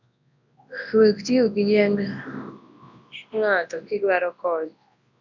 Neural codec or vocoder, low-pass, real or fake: codec, 24 kHz, 0.9 kbps, WavTokenizer, large speech release; 7.2 kHz; fake